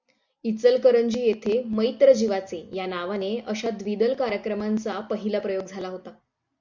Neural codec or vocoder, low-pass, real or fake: none; 7.2 kHz; real